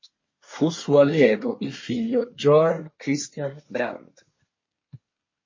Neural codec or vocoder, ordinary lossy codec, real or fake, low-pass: codec, 24 kHz, 1 kbps, SNAC; MP3, 32 kbps; fake; 7.2 kHz